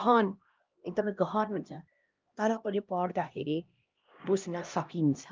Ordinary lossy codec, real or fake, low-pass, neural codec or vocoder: Opus, 32 kbps; fake; 7.2 kHz; codec, 16 kHz, 1 kbps, X-Codec, HuBERT features, trained on LibriSpeech